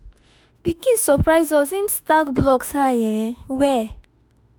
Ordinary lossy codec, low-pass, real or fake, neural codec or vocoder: none; none; fake; autoencoder, 48 kHz, 32 numbers a frame, DAC-VAE, trained on Japanese speech